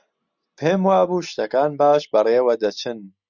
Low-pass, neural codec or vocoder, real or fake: 7.2 kHz; none; real